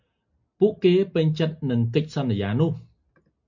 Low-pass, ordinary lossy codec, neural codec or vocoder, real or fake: 7.2 kHz; MP3, 48 kbps; none; real